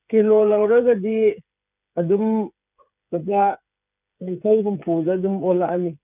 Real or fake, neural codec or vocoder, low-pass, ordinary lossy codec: fake; codec, 16 kHz, 8 kbps, FreqCodec, smaller model; 3.6 kHz; none